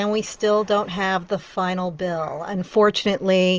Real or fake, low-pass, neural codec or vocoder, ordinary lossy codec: real; 7.2 kHz; none; Opus, 24 kbps